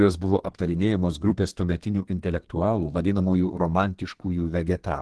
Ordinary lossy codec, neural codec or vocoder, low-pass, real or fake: Opus, 16 kbps; codec, 32 kHz, 1.9 kbps, SNAC; 10.8 kHz; fake